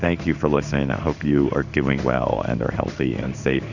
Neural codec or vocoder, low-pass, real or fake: codec, 16 kHz in and 24 kHz out, 1 kbps, XY-Tokenizer; 7.2 kHz; fake